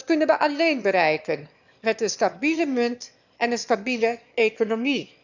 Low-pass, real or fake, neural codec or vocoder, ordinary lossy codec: 7.2 kHz; fake; autoencoder, 22.05 kHz, a latent of 192 numbers a frame, VITS, trained on one speaker; none